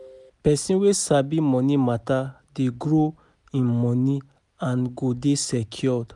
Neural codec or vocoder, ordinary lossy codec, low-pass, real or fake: none; none; 10.8 kHz; real